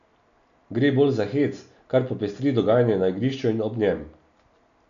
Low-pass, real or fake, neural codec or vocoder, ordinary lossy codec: 7.2 kHz; real; none; none